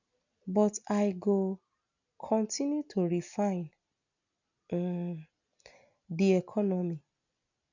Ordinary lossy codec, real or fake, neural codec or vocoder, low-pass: none; real; none; 7.2 kHz